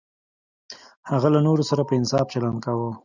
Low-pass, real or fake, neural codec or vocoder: 7.2 kHz; real; none